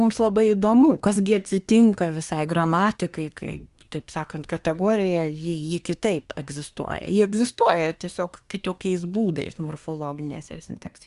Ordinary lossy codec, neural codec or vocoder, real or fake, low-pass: Opus, 64 kbps; codec, 24 kHz, 1 kbps, SNAC; fake; 10.8 kHz